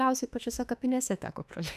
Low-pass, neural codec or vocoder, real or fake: 14.4 kHz; autoencoder, 48 kHz, 32 numbers a frame, DAC-VAE, trained on Japanese speech; fake